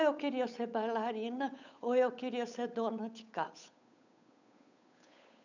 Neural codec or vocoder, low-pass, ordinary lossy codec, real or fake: codec, 16 kHz, 8 kbps, FunCodec, trained on Chinese and English, 25 frames a second; 7.2 kHz; none; fake